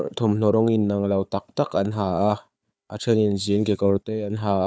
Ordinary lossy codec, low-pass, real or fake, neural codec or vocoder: none; none; fake; codec, 16 kHz, 4 kbps, FunCodec, trained on Chinese and English, 50 frames a second